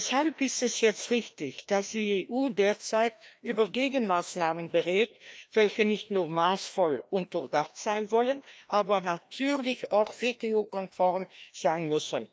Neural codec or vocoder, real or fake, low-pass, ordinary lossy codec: codec, 16 kHz, 1 kbps, FreqCodec, larger model; fake; none; none